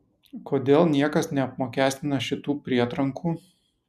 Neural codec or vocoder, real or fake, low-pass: none; real; 14.4 kHz